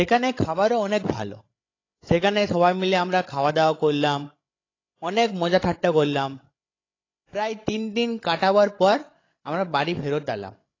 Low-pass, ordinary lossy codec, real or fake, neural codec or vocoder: 7.2 kHz; AAC, 32 kbps; fake; codec, 16 kHz, 16 kbps, FreqCodec, larger model